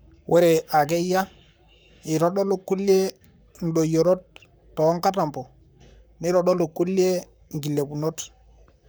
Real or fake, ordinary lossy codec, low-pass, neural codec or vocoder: fake; none; none; codec, 44.1 kHz, 7.8 kbps, Pupu-Codec